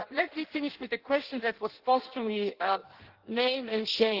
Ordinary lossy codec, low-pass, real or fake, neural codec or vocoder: Opus, 16 kbps; 5.4 kHz; fake; codec, 16 kHz in and 24 kHz out, 0.6 kbps, FireRedTTS-2 codec